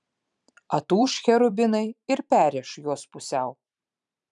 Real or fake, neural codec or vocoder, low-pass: fake; vocoder, 44.1 kHz, 128 mel bands every 512 samples, BigVGAN v2; 10.8 kHz